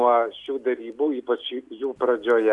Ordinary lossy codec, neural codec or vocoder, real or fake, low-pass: Opus, 64 kbps; none; real; 10.8 kHz